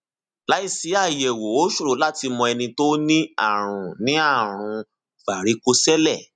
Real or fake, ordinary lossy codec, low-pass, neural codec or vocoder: real; none; 9.9 kHz; none